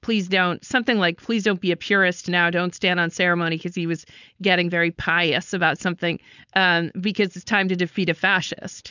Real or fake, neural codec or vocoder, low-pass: fake; codec, 16 kHz, 4.8 kbps, FACodec; 7.2 kHz